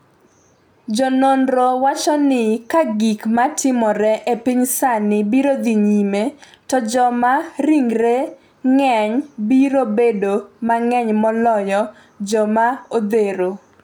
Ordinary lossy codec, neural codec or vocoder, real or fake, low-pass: none; none; real; none